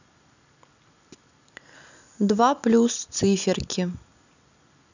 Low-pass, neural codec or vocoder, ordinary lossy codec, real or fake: 7.2 kHz; none; none; real